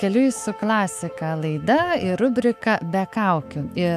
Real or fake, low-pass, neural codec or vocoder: fake; 14.4 kHz; autoencoder, 48 kHz, 128 numbers a frame, DAC-VAE, trained on Japanese speech